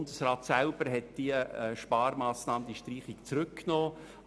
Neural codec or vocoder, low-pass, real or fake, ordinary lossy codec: none; none; real; none